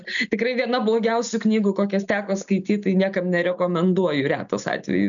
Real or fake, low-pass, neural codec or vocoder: real; 7.2 kHz; none